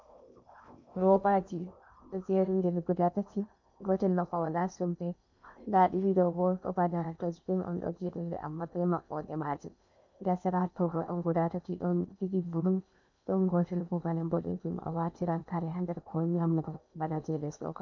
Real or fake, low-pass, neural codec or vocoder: fake; 7.2 kHz; codec, 16 kHz in and 24 kHz out, 0.8 kbps, FocalCodec, streaming, 65536 codes